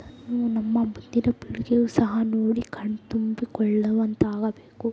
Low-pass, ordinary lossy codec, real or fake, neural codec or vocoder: none; none; real; none